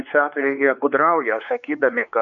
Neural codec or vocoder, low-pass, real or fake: codec, 16 kHz, 4 kbps, X-Codec, WavLM features, trained on Multilingual LibriSpeech; 7.2 kHz; fake